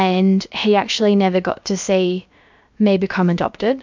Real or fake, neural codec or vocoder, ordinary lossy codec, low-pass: fake; codec, 16 kHz, about 1 kbps, DyCAST, with the encoder's durations; MP3, 64 kbps; 7.2 kHz